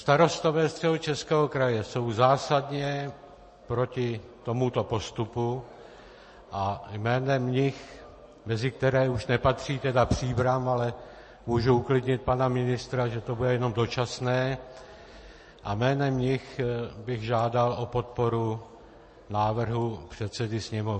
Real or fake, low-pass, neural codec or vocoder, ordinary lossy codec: real; 10.8 kHz; none; MP3, 32 kbps